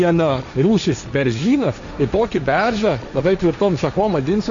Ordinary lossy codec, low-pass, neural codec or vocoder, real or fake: MP3, 64 kbps; 7.2 kHz; codec, 16 kHz, 1.1 kbps, Voila-Tokenizer; fake